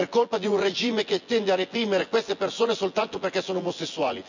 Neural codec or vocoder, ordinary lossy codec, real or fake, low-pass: vocoder, 24 kHz, 100 mel bands, Vocos; none; fake; 7.2 kHz